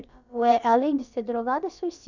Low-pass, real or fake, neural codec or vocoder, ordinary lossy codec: 7.2 kHz; fake; codec, 16 kHz, about 1 kbps, DyCAST, with the encoder's durations; none